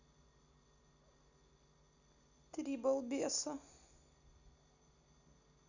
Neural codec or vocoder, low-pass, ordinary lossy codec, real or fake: none; 7.2 kHz; none; real